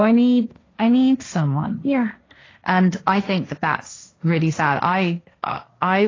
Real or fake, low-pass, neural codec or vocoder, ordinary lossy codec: fake; 7.2 kHz; codec, 16 kHz, 1.1 kbps, Voila-Tokenizer; AAC, 32 kbps